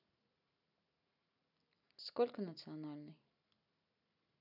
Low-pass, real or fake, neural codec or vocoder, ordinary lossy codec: 5.4 kHz; real; none; none